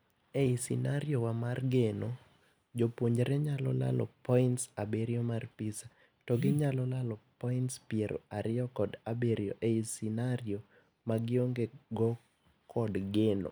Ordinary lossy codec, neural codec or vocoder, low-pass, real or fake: none; none; none; real